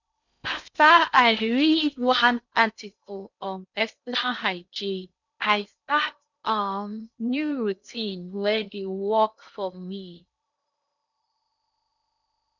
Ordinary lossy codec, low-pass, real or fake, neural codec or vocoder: none; 7.2 kHz; fake; codec, 16 kHz in and 24 kHz out, 0.8 kbps, FocalCodec, streaming, 65536 codes